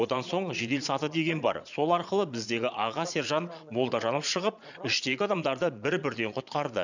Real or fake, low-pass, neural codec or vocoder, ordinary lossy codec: fake; 7.2 kHz; vocoder, 22.05 kHz, 80 mel bands, Vocos; none